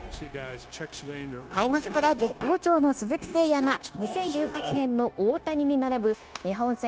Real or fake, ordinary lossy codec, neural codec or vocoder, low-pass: fake; none; codec, 16 kHz, 0.9 kbps, LongCat-Audio-Codec; none